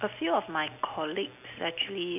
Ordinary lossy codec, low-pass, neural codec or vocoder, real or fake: none; 3.6 kHz; none; real